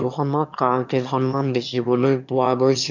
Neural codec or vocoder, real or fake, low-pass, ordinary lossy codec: autoencoder, 22.05 kHz, a latent of 192 numbers a frame, VITS, trained on one speaker; fake; 7.2 kHz; none